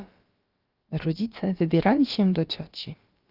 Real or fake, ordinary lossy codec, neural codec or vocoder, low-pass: fake; Opus, 32 kbps; codec, 16 kHz, about 1 kbps, DyCAST, with the encoder's durations; 5.4 kHz